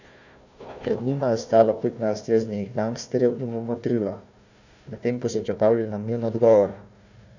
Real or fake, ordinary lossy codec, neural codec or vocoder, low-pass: fake; none; codec, 16 kHz, 1 kbps, FunCodec, trained on Chinese and English, 50 frames a second; 7.2 kHz